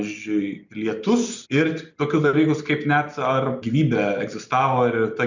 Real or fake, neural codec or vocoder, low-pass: real; none; 7.2 kHz